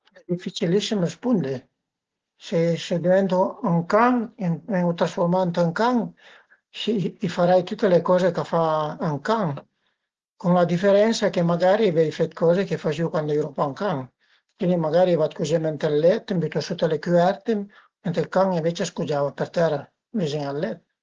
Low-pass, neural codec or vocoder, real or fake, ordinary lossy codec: 7.2 kHz; none; real; Opus, 16 kbps